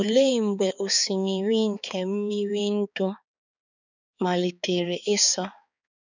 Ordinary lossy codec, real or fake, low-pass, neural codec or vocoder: none; fake; 7.2 kHz; codec, 16 kHz, 4 kbps, X-Codec, HuBERT features, trained on balanced general audio